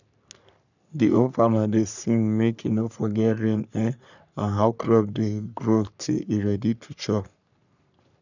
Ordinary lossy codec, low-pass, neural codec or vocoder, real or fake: none; 7.2 kHz; codec, 44.1 kHz, 3.4 kbps, Pupu-Codec; fake